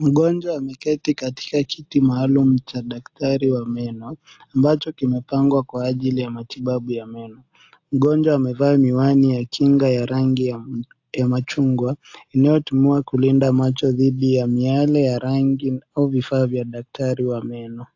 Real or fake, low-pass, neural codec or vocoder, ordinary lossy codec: real; 7.2 kHz; none; AAC, 48 kbps